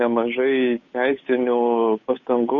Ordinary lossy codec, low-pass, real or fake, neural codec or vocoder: MP3, 32 kbps; 7.2 kHz; fake; codec, 16 kHz, 8 kbps, FunCodec, trained on Chinese and English, 25 frames a second